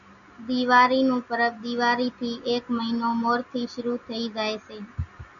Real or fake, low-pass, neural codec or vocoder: real; 7.2 kHz; none